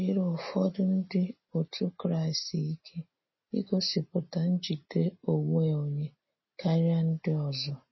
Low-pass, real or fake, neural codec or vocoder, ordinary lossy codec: 7.2 kHz; real; none; MP3, 24 kbps